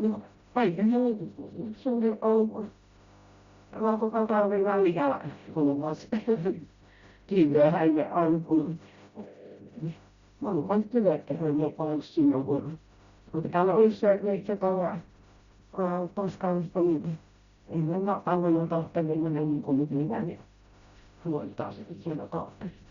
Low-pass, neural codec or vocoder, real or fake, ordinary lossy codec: 7.2 kHz; codec, 16 kHz, 0.5 kbps, FreqCodec, smaller model; fake; Opus, 64 kbps